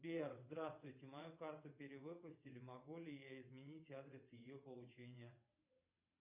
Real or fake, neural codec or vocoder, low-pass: fake; vocoder, 22.05 kHz, 80 mel bands, WaveNeXt; 3.6 kHz